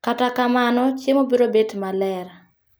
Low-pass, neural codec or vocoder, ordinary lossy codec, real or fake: none; none; none; real